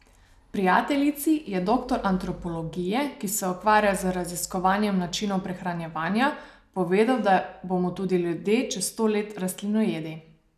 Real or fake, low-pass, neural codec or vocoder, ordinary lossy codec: real; 14.4 kHz; none; none